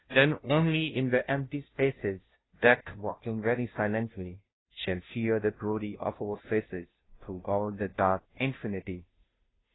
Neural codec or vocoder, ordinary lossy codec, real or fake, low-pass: codec, 16 kHz, 0.5 kbps, FunCodec, trained on Chinese and English, 25 frames a second; AAC, 16 kbps; fake; 7.2 kHz